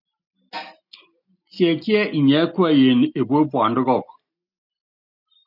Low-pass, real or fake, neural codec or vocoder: 5.4 kHz; real; none